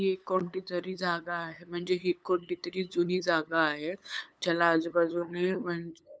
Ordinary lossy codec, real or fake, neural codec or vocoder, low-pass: none; fake; codec, 16 kHz, 8 kbps, FunCodec, trained on LibriTTS, 25 frames a second; none